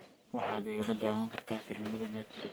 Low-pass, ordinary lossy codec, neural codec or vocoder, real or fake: none; none; codec, 44.1 kHz, 1.7 kbps, Pupu-Codec; fake